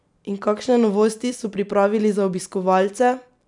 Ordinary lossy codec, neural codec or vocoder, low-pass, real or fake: none; none; 10.8 kHz; real